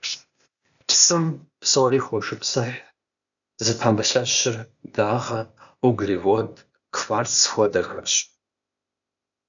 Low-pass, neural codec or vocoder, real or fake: 7.2 kHz; codec, 16 kHz, 0.8 kbps, ZipCodec; fake